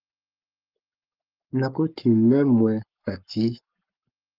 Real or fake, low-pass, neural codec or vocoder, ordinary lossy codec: fake; 5.4 kHz; codec, 44.1 kHz, 7.8 kbps, Pupu-Codec; Opus, 32 kbps